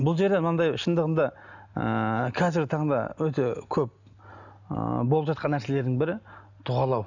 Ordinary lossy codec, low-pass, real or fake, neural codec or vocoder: none; 7.2 kHz; real; none